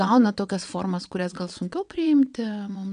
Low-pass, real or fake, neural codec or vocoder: 9.9 kHz; fake; vocoder, 22.05 kHz, 80 mel bands, WaveNeXt